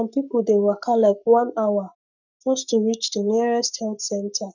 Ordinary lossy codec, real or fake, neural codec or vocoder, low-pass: none; fake; codec, 44.1 kHz, 7.8 kbps, Pupu-Codec; 7.2 kHz